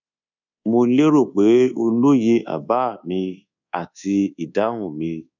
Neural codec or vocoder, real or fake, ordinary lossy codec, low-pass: codec, 24 kHz, 1.2 kbps, DualCodec; fake; none; 7.2 kHz